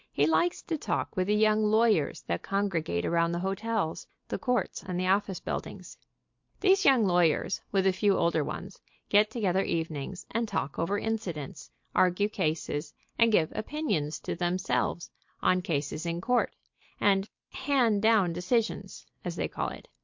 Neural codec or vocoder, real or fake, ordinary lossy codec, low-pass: none; real; MP3, 48 kbps; 7.2 kHz